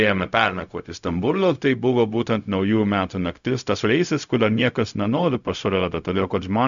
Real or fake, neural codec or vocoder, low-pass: fake; codec, 16 kHz, 0.4 kbps, LongCat-Audio-Codec; 7.2 kHz